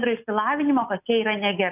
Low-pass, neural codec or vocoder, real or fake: 3.6 kHz; none; real